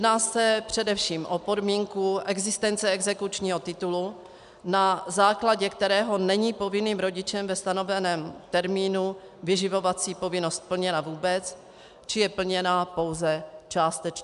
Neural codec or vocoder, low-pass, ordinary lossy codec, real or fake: none; 10.8 kHz; MP3, 96 kbps; real